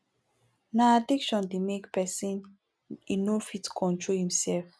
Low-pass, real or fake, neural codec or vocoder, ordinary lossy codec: none; real; none; none